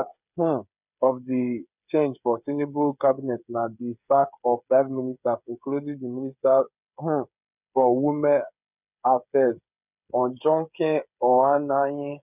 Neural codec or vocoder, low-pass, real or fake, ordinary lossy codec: codec, 16 kHz, 8 kbps, FreqCodec, smaller model; 3.6 kHz; fake; none